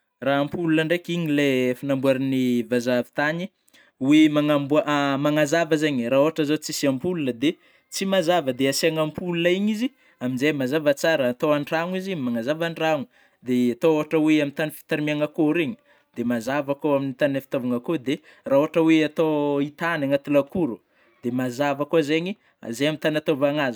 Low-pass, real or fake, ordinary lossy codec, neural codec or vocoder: none; real; none; none